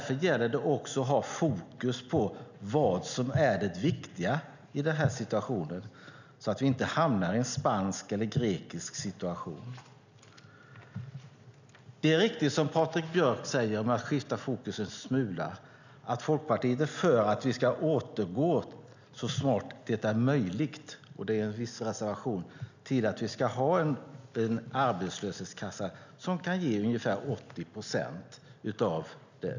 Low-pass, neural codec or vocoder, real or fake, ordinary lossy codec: 7.2 kHz; none; real; none